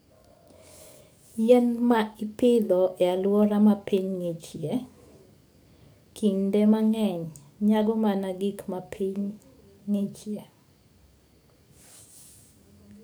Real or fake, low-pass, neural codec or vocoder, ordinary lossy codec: fake; none; codec, 44.1 kHz, 7.8 kbps, DAC; none